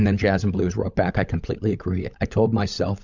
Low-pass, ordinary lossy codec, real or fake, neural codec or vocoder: 7.2 kHz; Opus, 64 kbps; fake; codec, 16 kHz, 16 kbps, FunCodec, trained on LibriTTS, 50 frames a second